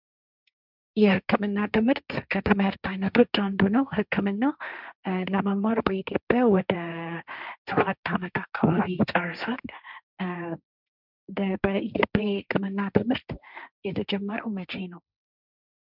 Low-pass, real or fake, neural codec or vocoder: 5.4 kHz; fake; codec, 16 kHz, 1.1 kbps, Voila-Tokenizer